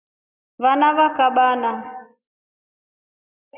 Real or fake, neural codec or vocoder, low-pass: real; none; 3.6 kHz